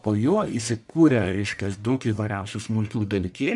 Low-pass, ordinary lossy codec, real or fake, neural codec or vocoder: 10.8 kHz; MP3, 96 kbps; fake; codec, 44.1 kHz, 1.7 kbps, Pupu-Codec